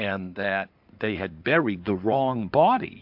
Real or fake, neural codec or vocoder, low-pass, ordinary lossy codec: fake; codec, 16 kHz in and 24 kHz out, 2.2 kbps, FireRedTTS-2 codec; 5.4 kHz; AAC, 48 kbps